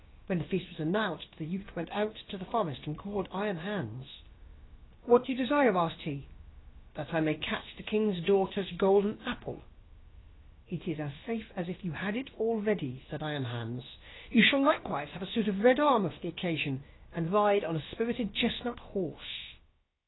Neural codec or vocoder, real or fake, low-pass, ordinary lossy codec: codec, 16 kHz, about 1 kbps, DyCAST, with the encoder's durations; fake; 7.2 kHz; AAC, 16 kbps